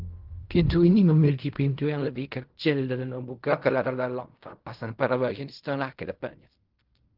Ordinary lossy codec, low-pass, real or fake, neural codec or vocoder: Opus, 32 kbps; 5.4 kHz; fake; codec, 16 kHz in and 24 kHz out, 0.4 kbps, LongCat-Audio-Codec, fine tuned four codebook decoder